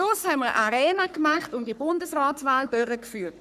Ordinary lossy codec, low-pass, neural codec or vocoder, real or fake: none; 14.4 kHz; codec, 44.1 kHz, 3.4 kbps, Pupu-Codec; fake